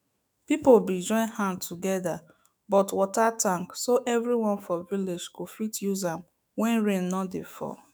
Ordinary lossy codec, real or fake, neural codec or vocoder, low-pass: none; fake; autoencoder, 48 kHz, 128 numbers a frame, DAC-VAE, trained on Japanese speech; none